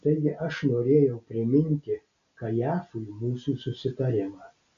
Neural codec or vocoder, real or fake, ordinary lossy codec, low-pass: none; real; AAC, 64 kbps; 7.2 kHz